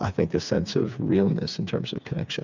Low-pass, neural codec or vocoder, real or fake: 7.2 kHz; codec, 16 kHz, 4 kbps, FreqCodec, smaller model; fake